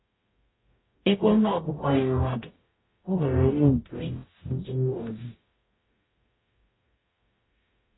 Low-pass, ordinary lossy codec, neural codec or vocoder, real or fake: 7.2 kHz; AAC, 16 kbps; codec, 44.1 kHz, 0.9 kbps, DAC; fake